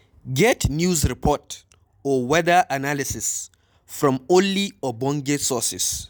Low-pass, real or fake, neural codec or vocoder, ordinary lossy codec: none; real; none; none